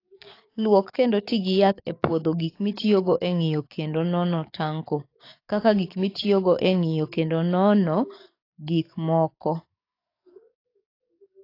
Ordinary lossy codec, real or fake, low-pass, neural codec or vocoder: AAC, 32 kbps; fake; 5.4 kHz; codec, 44.1 kHz, 7.8 kbps, DAC